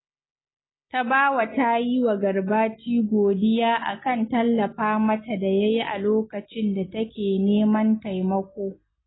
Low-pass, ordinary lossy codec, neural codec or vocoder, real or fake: 7.2 kHz; AAC, 16 kbps; none; real